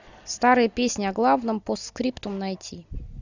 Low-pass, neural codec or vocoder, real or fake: 7.2 kHz; none; real